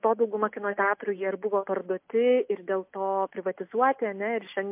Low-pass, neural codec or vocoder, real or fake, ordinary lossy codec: 3.6 kHz; none; real; MP3, 32 kbps